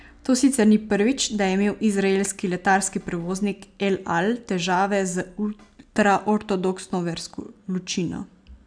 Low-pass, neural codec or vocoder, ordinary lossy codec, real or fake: 9.9 kHz; none; none; real